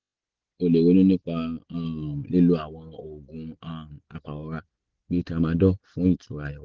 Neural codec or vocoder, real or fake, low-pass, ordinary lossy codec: none; real; 7.2 kHz; Opus, 16 kbps